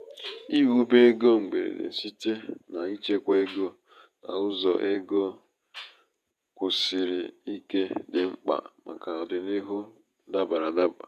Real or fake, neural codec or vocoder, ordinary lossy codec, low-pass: fake; vocoder, 48 kHz, 128 mel bands, Vocos; none; 14.4 kHz